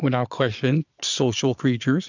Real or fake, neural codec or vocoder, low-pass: fake; vocoder, 22.05 kHz, 80 mel bands, Vocos; 7.2 kHz